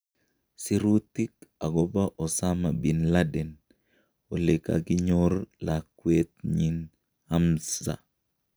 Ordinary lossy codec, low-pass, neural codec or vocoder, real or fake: none; none; none; real